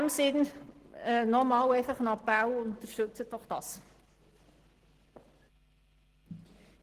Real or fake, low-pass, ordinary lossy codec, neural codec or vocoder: real; 14.4 kHz; Opus, 16 kbps; none